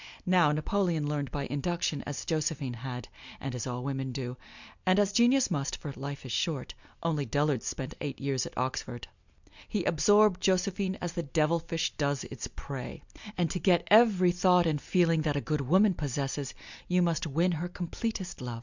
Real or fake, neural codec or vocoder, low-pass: real; none; 7.2 kHz